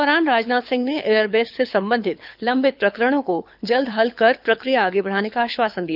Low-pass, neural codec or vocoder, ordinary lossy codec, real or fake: 5.4 kHz; codec, 24 kHz, 6 kbps, HILCodec; none; fake